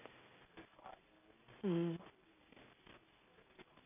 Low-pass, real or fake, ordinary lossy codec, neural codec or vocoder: 3.6 kHz; real; none; none